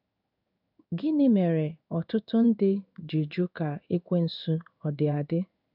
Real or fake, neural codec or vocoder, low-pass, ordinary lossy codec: fake; codec, 16 kHz in and 24 kHz out, 1 kbps, XY-Tokenizer; 5.4 kHz; none